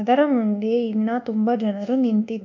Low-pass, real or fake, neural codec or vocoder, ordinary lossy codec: 7.2 kHz; fake; codec, 24 kHz, 1.2 kbps, DualCodec; MP3, 64 kbps